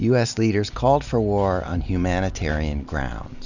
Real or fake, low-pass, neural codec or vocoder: real; 7.2 kHz; none